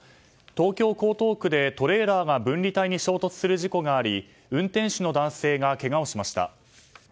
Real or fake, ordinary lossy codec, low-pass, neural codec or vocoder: real; none; none; none